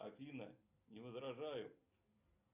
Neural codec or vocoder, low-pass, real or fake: none; 3.6 kHz; real